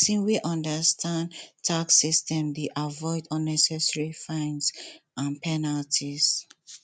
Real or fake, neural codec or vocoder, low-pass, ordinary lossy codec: real; none; none; none